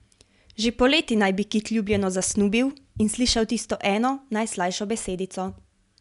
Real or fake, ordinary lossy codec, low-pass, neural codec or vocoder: real; none; 10.8 kHz; none